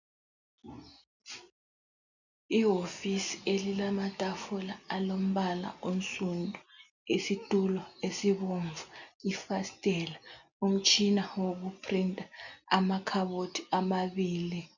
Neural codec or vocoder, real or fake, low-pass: none; real; 7.2 kHz